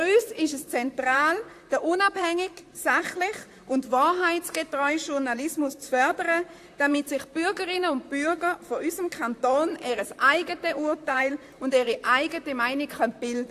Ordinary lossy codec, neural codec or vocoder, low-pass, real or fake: AAC, 64 kbps; vocoder, 44.1 kHz, 128 mel bands, Pupu-Vocoder; 14.4 kHz; fake